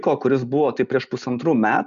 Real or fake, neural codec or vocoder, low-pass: real; none; 7.2 kHz